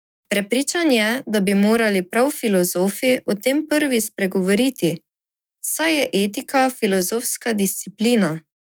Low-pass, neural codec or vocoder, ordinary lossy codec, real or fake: none; codec, 44.1 kHz, 7.8 kbps, DAC; none; fake